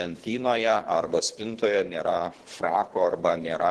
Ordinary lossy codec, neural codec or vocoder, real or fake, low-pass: Opus, 16 kbps; codec, 24 kHz, 3 kbps, HILCodec; fake; 10.8 kHz